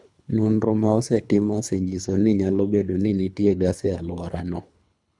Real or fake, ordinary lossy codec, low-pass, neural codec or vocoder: fake; none; 10.8 kHz; codec, 24 kHz, 3 kbps, HILCodec